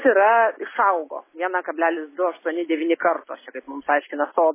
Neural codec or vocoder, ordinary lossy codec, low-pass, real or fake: none; MP3, 16 kbps; 3.6 kHz; real